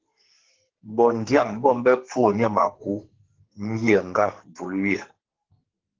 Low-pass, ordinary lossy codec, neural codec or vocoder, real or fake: 7.2 kHz; Opus, 16 kbps; codec, 44.1 kHz, 2.6 kbps, SNAC; fake